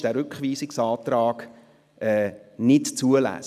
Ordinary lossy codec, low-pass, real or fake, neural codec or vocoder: none; 14.4 kHz; real; none